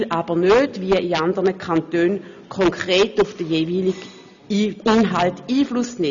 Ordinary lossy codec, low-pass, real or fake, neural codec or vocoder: none; 7.2 kHz; real; none